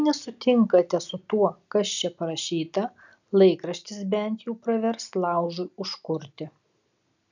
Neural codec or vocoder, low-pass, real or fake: none; 7.2 kHz; real